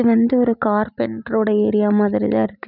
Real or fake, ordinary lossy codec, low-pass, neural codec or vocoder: real; none; 5.4 kHz; none